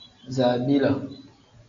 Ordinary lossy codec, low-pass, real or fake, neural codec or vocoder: AAC, 64 kbps; 7.2 kHz; real; none